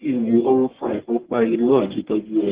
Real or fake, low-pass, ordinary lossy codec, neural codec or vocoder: fake; 3.6 kHz; Opus, 16 kbps; codec, 44.1 kHz, 1.7 kbps, Pupu-Codec